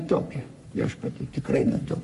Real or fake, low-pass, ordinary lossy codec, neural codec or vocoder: fake; 14.4 kHz; MP3, 48 kbps; codec, 44.1 kHz, 3.4 kbps, Pupu-Codec